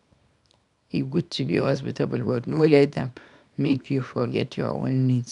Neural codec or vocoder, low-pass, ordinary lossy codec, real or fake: codec, 24 kHz, 0.9 kbps, WavTokenizer, small release; 10.8 kHz; none; fake